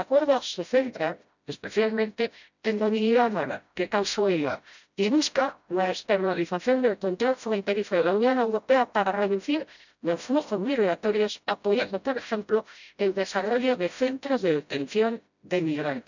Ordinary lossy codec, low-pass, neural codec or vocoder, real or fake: none; 7.2 kHz; codec, 16 kHz, 0.5 kbps, FreqCodec, smaller model; fake